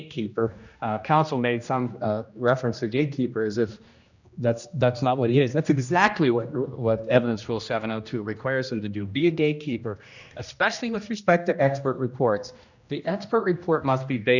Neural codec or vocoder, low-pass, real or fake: codec, 16 kHz, 1 kbps, X-Codec, HuBERT features, trained on general audio; 7.2 kHz; fake